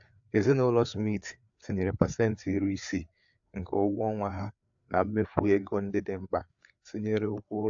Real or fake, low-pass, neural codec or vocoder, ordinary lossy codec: fake; 7.2 kHz; codec, 16 kHz, 4 kbps, FreqCodec, larger model; AAC, 64 kbps